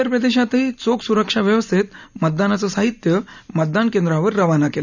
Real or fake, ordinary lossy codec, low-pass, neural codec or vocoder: real; none; 7.2 kHz; none